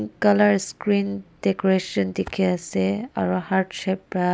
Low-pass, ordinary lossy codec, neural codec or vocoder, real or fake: none; none; none; real